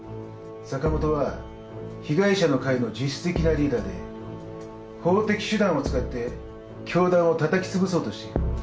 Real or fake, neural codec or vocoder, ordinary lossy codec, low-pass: real; none; none; none